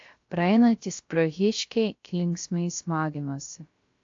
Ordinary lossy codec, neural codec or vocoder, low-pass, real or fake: AAC, 48 kbps; codec, 16 kHz, 0.3 kbps, FocalCodec; 7.2 kHz; fake